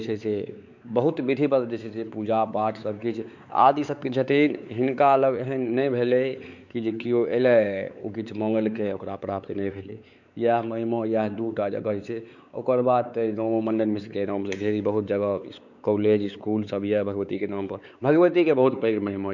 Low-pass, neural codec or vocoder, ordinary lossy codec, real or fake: 7.2 kHz; codec, 16 kHz, 4 kbps, X-Codec, WavLM features, trained on Multilingual LibriSpeech; none; fake